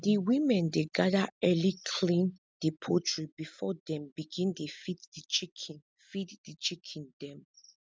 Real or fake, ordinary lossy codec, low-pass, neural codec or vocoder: real; none; none; none